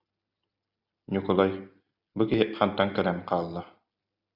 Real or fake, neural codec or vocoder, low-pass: real; none; 5.4 kHz